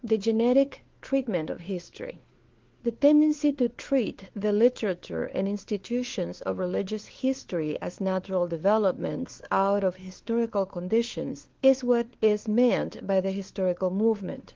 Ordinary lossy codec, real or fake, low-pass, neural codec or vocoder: Opus, 16 kbps; fake; 7.2 kHz; codec, 16 kHz, 4 kbps, FunCodec, trained on LibriTTS, 50 frames a second